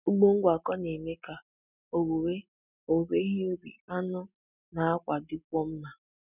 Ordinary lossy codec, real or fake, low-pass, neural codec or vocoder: none; real; 3.6 kHz; none